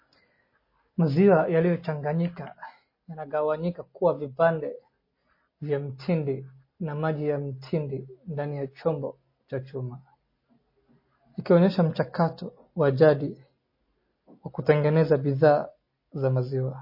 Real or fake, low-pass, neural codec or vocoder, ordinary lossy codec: real; 5.4 kHz; none; MP3, 24 kbps